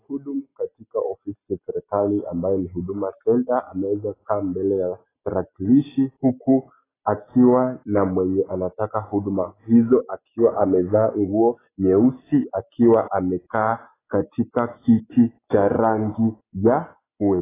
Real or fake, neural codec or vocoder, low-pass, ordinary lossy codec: real; none; 3.6 kHz; AAC, 16 kbps